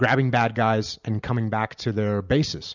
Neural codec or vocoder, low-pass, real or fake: none; 7.2 kHz; real